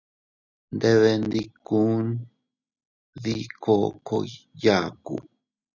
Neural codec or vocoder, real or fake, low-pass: none; real; 7.2 kHz